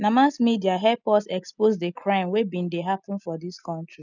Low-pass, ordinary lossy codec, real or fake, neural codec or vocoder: 7.2 kHz; none; real; none